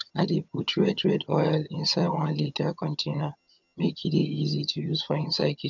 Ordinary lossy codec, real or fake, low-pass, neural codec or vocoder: none; fake; 7.2 kHz; vocoder, 22.05 kHz, 80 mel bands, HiFi-GAN